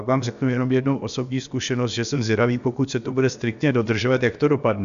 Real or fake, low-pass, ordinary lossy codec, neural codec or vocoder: fake; 7.2 kHz; AAC, 96 kbps; codec, 16 kHz, about 1 kbps, DyCAST, with the encoder's durations